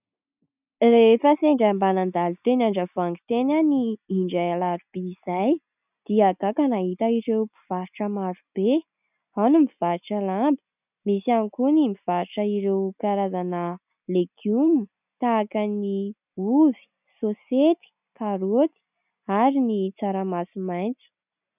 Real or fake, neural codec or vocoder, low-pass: real; none; 3.6 kHz